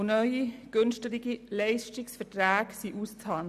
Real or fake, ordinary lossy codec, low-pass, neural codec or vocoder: real; none; 14.4 kHz; none